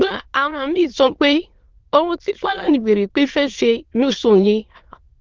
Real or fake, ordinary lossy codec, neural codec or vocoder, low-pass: fake; Opus, 16 kbps; autoencoder, 22.05 kHz, a latent of 192 numbers a frame, VITS, trained on many speakers; 7.2 kHz